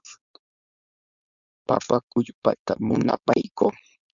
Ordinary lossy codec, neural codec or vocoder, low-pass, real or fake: MP3, 96 kbps; codec, 16 kHz, 4.8 kbps, FACodec; 7.2 kHz; fake